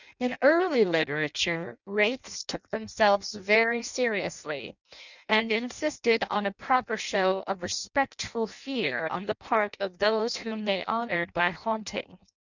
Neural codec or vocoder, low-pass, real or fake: codec, 16 kHz in and 24 kHz out, 0.6 kbps, FireRedTTS-2 codec; 7.2 kHz; fake